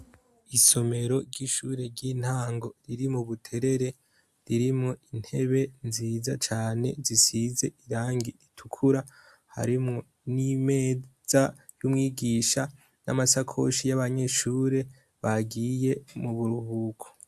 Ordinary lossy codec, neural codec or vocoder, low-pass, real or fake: AAC, 96 kbps; none; 14.4 kHz; real